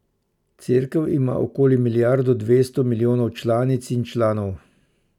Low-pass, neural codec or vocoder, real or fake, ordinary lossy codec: 19.8 kHz; none; real; none